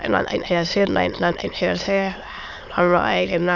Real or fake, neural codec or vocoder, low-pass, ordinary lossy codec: fake; autoencoder, 22.05 kHz, a latent of 192 numbers a frame, VITS, trained on many speakers; 7.2 kHz; none